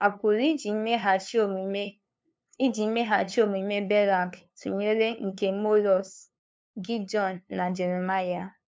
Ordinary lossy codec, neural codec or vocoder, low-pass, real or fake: none; codec, 16 kHz, 2 kbps, FunCodec, trained on LibriTTS, 25 frames a second; none; fake